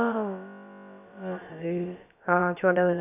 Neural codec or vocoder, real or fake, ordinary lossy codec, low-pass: codec, 16 kHz, about 1 kbps, DyCAST, with the encoder's durations; fake; none; 3.6 kHz